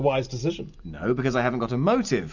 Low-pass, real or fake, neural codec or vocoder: 7.2 kHz; real; none